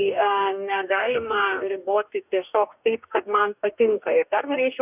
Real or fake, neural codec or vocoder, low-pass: fake; codec, 44.1 kHz, 2.6 kbps, DAC; 3.6 kHz